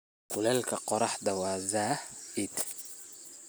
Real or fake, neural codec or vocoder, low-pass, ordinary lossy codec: real; none; none; none